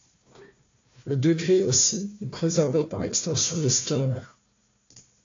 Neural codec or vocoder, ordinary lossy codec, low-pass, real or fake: codec, 16 kHz, 1 kbps, FunCodec, trained on Chinese and English, 50 frames a second; AAC, 48 kbps; 7.2 kHz; fake